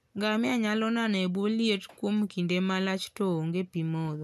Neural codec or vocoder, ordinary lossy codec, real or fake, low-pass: none; none; real; 14.4 kHz